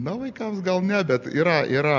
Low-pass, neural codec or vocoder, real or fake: 7.2 kHz; none; real